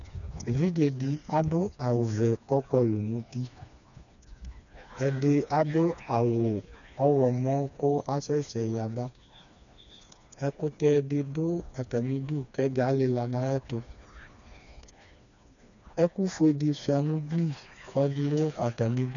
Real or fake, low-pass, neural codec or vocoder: fake; 7.2 kHz; codec, 16 kHz, 2 kbps, FreqCodec, smaller model